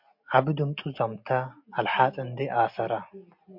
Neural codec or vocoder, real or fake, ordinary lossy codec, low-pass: none; real; MP3, 48 kbps; 5.4 kHz